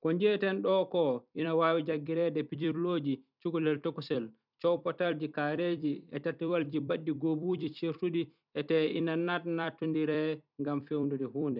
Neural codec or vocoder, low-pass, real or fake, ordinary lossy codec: vocoder, 44.1 kHz, 128 mel bands every 256 samples, BigVGAN v2; 5.4 kHz; fake; none